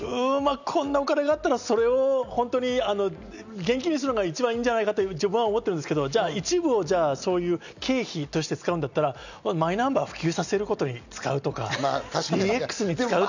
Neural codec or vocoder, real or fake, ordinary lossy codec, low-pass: none; real; none; 7.2 kHz